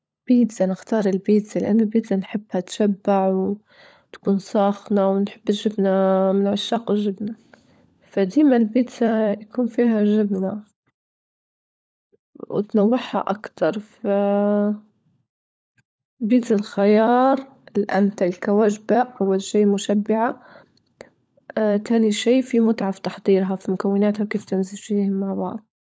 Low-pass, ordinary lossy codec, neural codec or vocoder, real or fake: none; none; codec, 16 kHz, 16 kbps, FunCodec, trained on LibriTTS, 50 frames a second; fake